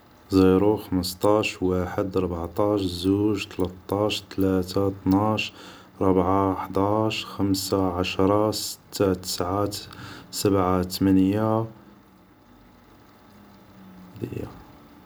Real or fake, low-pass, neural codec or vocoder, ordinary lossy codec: real; none; none; none